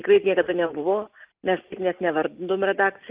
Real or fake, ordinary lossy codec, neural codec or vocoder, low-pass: real; Opus, 24 kbps; none; 3.6 kHz